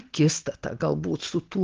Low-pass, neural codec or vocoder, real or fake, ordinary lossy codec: 7.2 kHz; none; real; Opus, 16 kbps